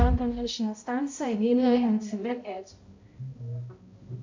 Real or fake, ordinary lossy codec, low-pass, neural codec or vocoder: fake; MP3, 48 kbps; 7.2 kHz; codec, 16 kHz, 0.5 kbps, X-Codec, HuBERT features, trained on balanced general audio